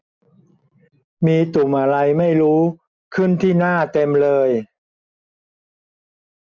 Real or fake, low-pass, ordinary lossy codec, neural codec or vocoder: real; none; none; none